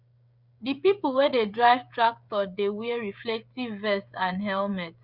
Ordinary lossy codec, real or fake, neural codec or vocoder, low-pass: Opus, 64 kbps; fake; codec, 16 kHz, 16 kbps, FreqCodec, smaller model; 5.4 kHz